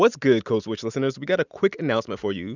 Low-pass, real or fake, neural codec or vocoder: 7.2 kHz; real; none